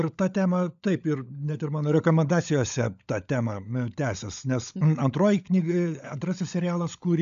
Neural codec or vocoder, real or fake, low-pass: codec, 16 kHz, 16 kbps, FunCodec, trained on LibriTTS, 50 frames a second; fake; 7.2 kHz